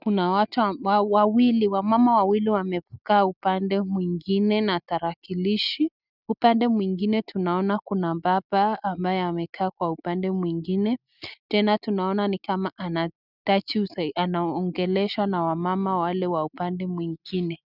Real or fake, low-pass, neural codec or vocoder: real; 5.4 kHz; none